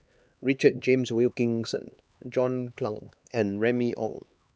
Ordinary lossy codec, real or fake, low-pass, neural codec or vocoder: none; fake; none; codec, 16 kHz, 2 kbps, X-Codec, HuBERT features, trained on LibriSpeech